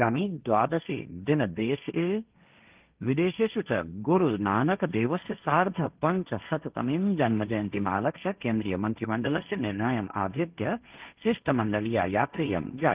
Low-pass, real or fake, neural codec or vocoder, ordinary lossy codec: 3.6 kHz; fake; codec, 16 kHz, 1.1 kbps, Voila-Tokenizer; Opus, 24 kbps